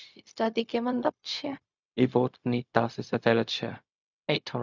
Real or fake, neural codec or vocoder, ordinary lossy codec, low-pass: fake; codec, 16 kHz, 0.4 kbps, LongCat-Audio-Codec; none; 7.2 kHz